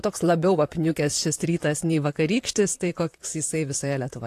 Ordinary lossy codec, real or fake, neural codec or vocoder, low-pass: AAC, 64 kbps; fake; vocoder, 44.1 kHz, 128 mel bands, Pupu-Vocoder; 14.4 kHz